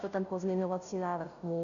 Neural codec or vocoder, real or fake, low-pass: codec, 16 kHz, 0.5 kbps, FunCodec, trained on Chinese and English, 25 frames a second; fake; 7.2 kHz